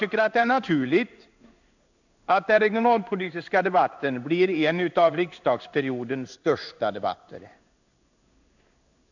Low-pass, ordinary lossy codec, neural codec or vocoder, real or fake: 7.2 kHz; none; codec, 16 kHz in and 24 kHz out, 1 kbps, XY-Tokenizer; fake